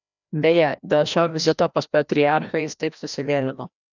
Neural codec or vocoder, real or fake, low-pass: codec, 16 kHz, 1 kbps, FreqCodec, larger model; fake; 7.2 kHz